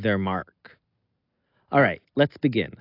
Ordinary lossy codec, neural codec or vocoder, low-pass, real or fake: AAC, 32 kbps; none; 5.4 kHz; real